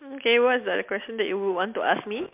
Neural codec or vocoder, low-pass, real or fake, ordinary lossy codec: none; 3.6 kHz; real; MP3, 32 kbps